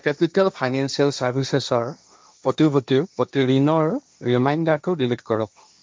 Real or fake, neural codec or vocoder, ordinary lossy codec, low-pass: fake; codec, 16 kHz, 1.1 kbps, Voila-Tokenizer; none; none